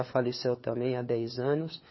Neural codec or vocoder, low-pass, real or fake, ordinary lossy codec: codec, 16 kHz, 4 kbps, FunCodec, trained on LibriTTS, 50 frames a second; 7.2 kHz; fake; MP3, 24 kbps